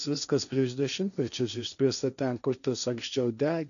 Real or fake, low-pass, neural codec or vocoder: fake; 7.2 kHz; codec, 16 kHz, 1.1 kbps, Voila-Tokenizer